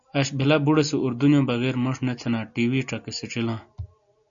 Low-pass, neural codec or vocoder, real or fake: 7.2 kHz; none; real